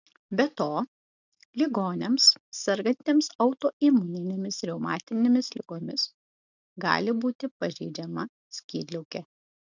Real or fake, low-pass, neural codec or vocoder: real; 7.2 kHz; none